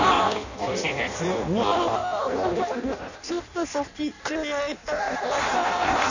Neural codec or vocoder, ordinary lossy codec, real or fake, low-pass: codec, 16 kHz in and 24 kHz out, 0.6 kbps, FireRedTTS-2 codec; none; fake; 7.2 kHz